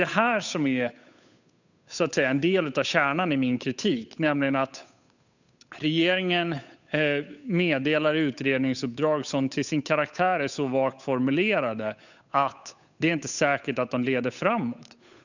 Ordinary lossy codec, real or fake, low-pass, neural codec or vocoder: none; fake; 7.2 kHz; codec, 16 kHz, 8 kbps, FunCodec, trained on Chinese and English, 25 frames a second